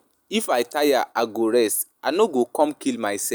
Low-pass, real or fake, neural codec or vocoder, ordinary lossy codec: none; real; none; none